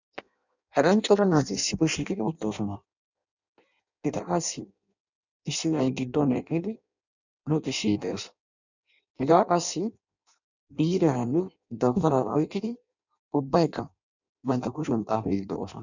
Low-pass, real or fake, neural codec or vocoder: 7.2 kHz; fake; codec, 16 kHz in and 24 kHz out, 0.6 kbps, FireRedTTS-2 codec